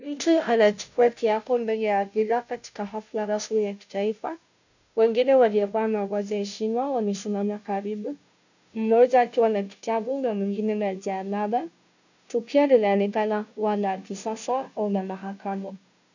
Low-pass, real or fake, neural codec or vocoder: 7.2 kHz; fake; codec, 16 kHz, 0.5 kbps, FunCodec, trained on Chinese and English, 25 frames a second